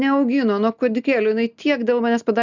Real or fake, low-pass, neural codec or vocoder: real; 7.2 kHz; none